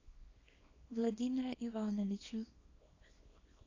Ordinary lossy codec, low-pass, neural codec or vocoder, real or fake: AAC, 32 kbps; 7.2 kHz; codec, 24 kHz, 0.9 kbps, WavTokenizer, small release; fake